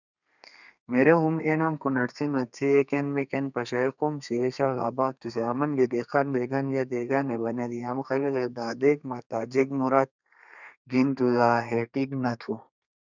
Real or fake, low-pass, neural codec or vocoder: fake; 7.2 kHz; codec, 32 kHz, 1.9 kbps, SNAC